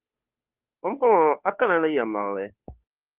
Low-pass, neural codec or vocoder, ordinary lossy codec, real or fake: 3.6 kHz; codec, 16 kHz, 2 kbps, FunCodec, trained on Chinese and English, 25 frames a second; Opus, 24 kbps; fake